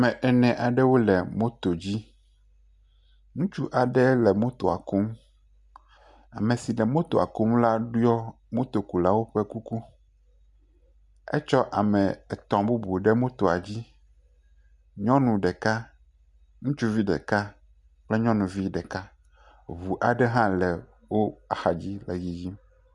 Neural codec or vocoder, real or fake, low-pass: none; real; 10.8 kHz